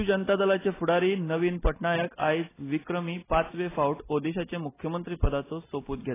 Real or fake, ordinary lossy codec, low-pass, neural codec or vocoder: real; AAC, 16 kbps; 3.6 kHz; none